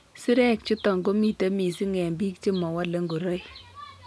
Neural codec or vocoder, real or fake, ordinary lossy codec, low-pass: none; real; none; none